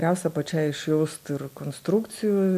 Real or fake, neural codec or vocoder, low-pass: real; none; 14.4 kHz